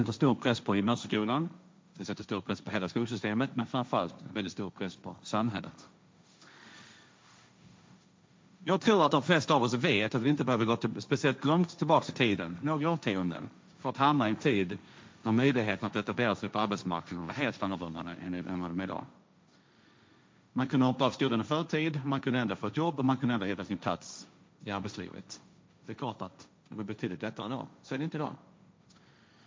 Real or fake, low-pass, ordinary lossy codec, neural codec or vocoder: fake; none; none; codec, 16 kHz, 1.1 kbps, Voila-Tokenizer